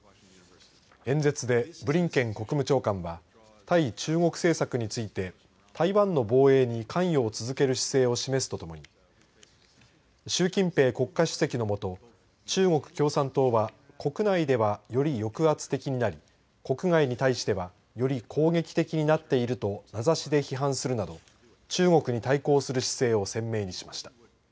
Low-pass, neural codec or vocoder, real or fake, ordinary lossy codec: none; none; real; none